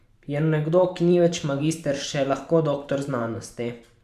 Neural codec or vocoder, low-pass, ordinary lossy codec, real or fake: vocoder, 44.1 kHz, 128 mel bands every 512 samples, BigVGAN v2; 14.4 kHz; none; fake